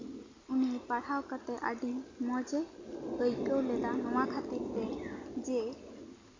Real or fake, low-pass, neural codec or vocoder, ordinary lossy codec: real; 7.2 kHz; none; MP3, 48 kbps